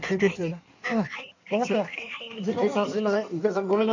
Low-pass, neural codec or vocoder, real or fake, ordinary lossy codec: 7.2 kHz; codec, 16 kHz in and 24 kHz out, 1.1 kbps, FireRedTTS-2 codec; fake; none